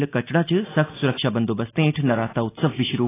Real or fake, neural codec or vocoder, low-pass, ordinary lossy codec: real; none; 3.6 kHz; AAC, 16 kbps